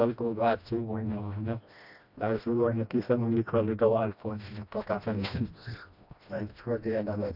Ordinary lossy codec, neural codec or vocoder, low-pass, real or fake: none; codec, 16 kHz, 1 kbps, FreqCodec, smaller model; 5.4 kHz; fake